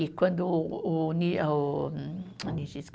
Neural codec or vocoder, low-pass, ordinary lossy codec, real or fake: none; none; none; real